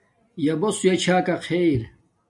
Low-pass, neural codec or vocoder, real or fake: 10.8 kHz; none; real